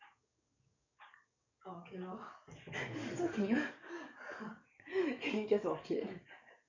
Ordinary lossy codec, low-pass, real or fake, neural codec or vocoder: none; 7.2 kHz; fake; codec, 44.1 kHz, 7.8 kbps, DAC